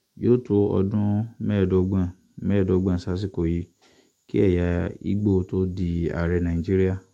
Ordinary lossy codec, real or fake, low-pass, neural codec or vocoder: MP3, 64 kbps; fake; 19.8 kHz; autoencoder, 48 kHz, 128 numbers a frame, DAC-VAE, trained on Japanese speech